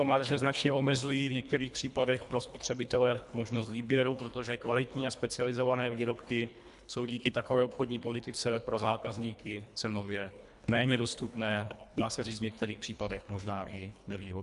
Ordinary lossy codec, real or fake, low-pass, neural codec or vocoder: AAC, 96 kbps; fake; 10.8 kHz; codec, 24 kHz, 1.5 kbps, HILCodec